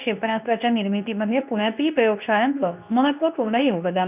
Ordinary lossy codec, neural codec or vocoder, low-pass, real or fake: none; codec, 24 kHz, 0.9 kbps, WavTokenizer, medium speech release version 2; 3.6 kHz; fake